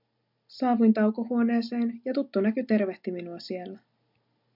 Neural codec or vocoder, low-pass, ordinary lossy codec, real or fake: none; 5.4 kHz; MP3, 48 kbps; real